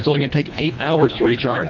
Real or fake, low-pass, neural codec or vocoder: fake; 7.2 kHz; codec, 24 kHz, 1.5 kbps, HILCodec